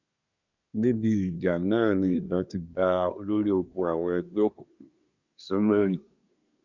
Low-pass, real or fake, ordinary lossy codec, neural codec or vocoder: 7.2 kHz; fake; none; codec, 24 kHz, 1 kbps, SNAC